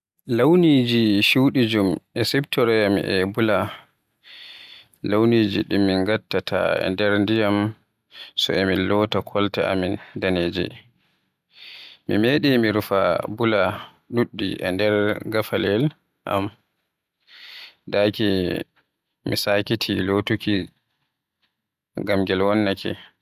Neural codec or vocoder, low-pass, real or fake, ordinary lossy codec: none; 14.4 kHz; real; none